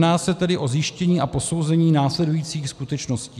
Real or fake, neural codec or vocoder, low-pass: real; none; 14.4 kHz